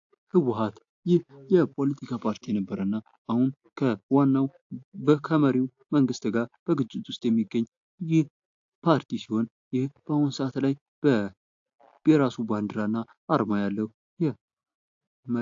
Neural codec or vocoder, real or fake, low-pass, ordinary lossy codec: none; real; 7.2 kHz; AAC, 48 kbps